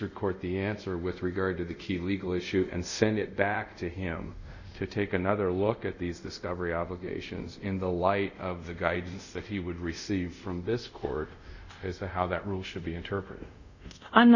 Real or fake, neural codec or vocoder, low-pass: fake; codec, 24 kHz, 0.5 kbps, DualCodec; 7.2 kHz